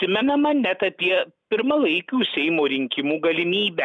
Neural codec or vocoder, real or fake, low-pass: none; real; 9.9 kHz